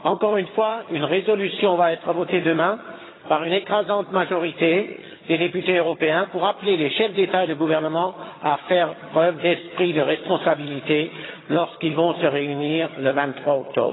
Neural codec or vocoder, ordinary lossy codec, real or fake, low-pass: vocoder, 22.05 kHz, 80 mel bands, HiFi-GAN; AAC, 16 kbps; fake; 7.2 kHz